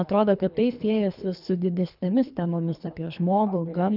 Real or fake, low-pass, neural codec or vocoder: fake; 5.4 kHz; codec, 24 kHz, 3 kbps, HILCodec